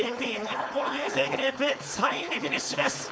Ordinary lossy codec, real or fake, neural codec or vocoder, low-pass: none; fake; codec, 16 kHz, 4.8 kbps, FACodec; none